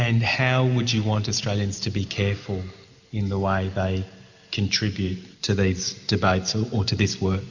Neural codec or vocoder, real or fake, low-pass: none; real; 7.2 kHz